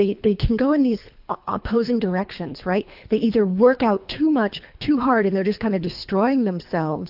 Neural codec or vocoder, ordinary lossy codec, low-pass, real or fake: codec, 24 kHz, 3 kbps, HILCodec; AAC, 48 kbps; 5.4 kHz; fake